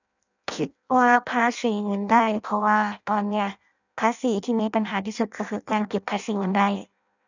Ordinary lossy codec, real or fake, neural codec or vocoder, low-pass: none; fake; codec, 16 kHz in and 24 kHz out, 0.6 kbps, FireRedTTS-2 codec; 7.2 kHz